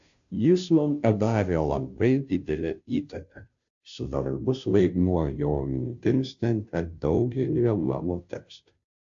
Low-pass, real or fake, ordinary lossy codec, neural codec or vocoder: 7.2 kHz; fake; AAC, 64 kbps; codec, 16 kHz, 0.5 kbps, FunCodec, trained on Chinese and English, 25 frames a second